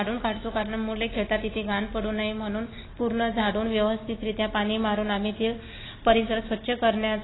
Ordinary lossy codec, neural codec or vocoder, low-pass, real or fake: AAC, 16 kbps; none; 7.2 kHz; real